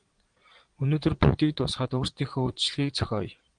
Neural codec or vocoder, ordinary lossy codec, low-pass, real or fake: vocoder, 22.05 kHz, 80 mel bands, Vocos; Opus, 24 kbps; 9.9 kHz; fake